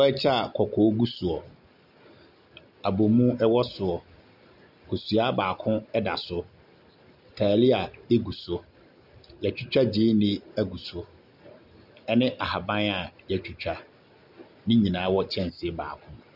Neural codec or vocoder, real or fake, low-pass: none; real; 5.4 kHz